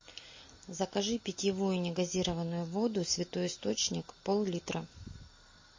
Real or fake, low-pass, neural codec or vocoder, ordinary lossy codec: real; 7.2 kHz; none; MP3, 32 kbps